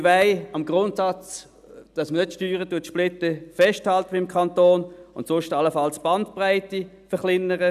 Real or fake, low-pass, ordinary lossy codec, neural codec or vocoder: real; 14.4 kHz; none; none